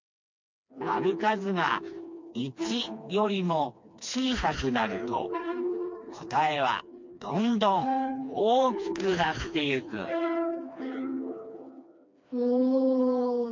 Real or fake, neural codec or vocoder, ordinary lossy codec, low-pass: fake; codec, 16 kHz, 2 kbps, FreqCodec, smaller model; MP3, 48 kbps; 7.2 kHz